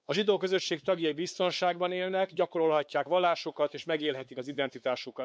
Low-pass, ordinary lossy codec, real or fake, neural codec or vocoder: none; none; fake; codec, 16 kHz, 4 kbps, X-Codec, WavLM features, trained on Multilingual LibriSpeech